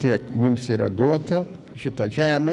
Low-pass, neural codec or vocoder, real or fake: 10.8 kHz; codec, 44.1 kHz, 2.6 kbps, SNAC; fake